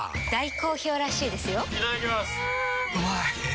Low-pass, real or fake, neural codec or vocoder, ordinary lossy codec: none; real; none; none